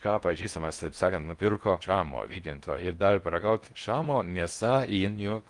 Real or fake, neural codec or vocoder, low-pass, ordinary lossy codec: fake; codec, 16 kHz in and 24 kHz out, 0.6 kbps, FocalCodec, streaming, 4096 codes; 10.8 kHz; Opus, 32 kbps